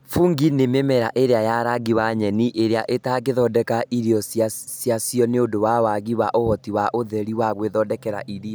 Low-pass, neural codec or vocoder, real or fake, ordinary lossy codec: none; none; real; none